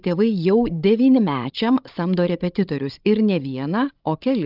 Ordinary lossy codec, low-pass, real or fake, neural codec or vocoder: Opus, 32 kbps; 5.4 kHz; fake; codec, 16 kHz, 16 kbps, FreqCodec, larger model